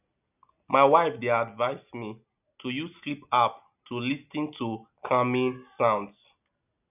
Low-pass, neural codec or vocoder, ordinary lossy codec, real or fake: 3.6 kHz; none; none; real